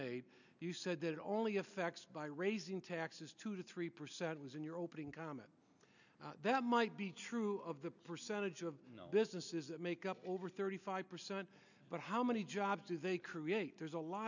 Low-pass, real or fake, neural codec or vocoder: 7.2 kHz; real; none